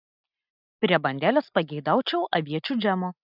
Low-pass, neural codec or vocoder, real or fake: 5.4 kHz; none; real